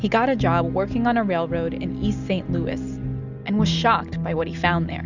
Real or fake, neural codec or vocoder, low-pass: real; none; 7.2 kHz